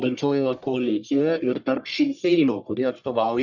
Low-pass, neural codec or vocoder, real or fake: 7.2 kHz; codec, 44.1 kHz, 1.7 kbps, Pupu-Codec; fake